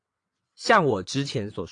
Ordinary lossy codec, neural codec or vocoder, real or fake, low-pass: AAC, 48 kbps; vocoder, 22.05 kHz, 80 mel bands, WaveNeXt; fake; 9.9 kHz